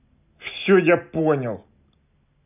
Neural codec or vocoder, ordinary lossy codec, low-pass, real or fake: none; none; 3.6 kHz; real